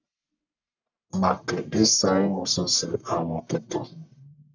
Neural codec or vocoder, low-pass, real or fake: codec, 44.1 kHz, 1.7 kbps, Pupu-Codec; 7.2 kHz; fake